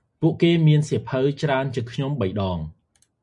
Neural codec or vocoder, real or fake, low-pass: none; real; 10.8 kHz